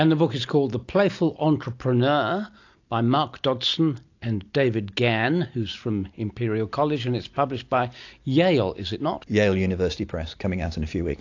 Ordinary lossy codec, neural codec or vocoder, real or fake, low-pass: AAC, 48 kbps; none; real; 7.2 kHz